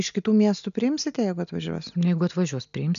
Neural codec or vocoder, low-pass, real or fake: none; 7.2 kHz; real